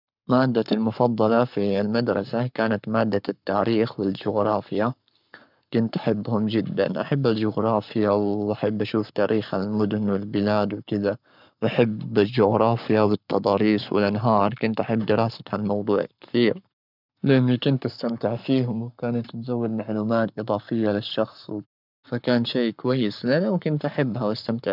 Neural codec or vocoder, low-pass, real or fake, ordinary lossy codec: codec, 44.1 kHz, 7.8 kbps, Pupu-Codec; 5.4 kHz; fake; none